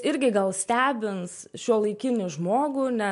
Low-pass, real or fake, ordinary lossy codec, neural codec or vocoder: 10.8 kHz; real; MP3, 64 kbps; none